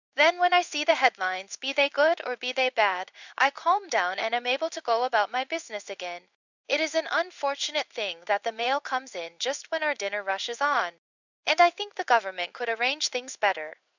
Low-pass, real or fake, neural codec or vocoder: 7.2 kHz; fake; codec, 16 kHz in and 24 kHz out, 1 kbps, XY-Tokenizer